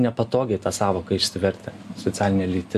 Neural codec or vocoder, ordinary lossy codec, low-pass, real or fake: none; AAC, 96 kbps; 14.4 kHz; real